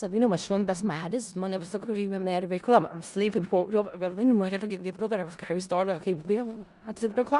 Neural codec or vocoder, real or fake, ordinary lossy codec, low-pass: codec, 16 kHz in and 24 kHz out, 0.4 kbps, LongCat-Audio-Codec, four codebook decoder; fake; Opus, 64 kbps; 10.8 kHz